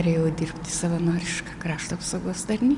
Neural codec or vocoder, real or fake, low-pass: none; real; 10.8 kHz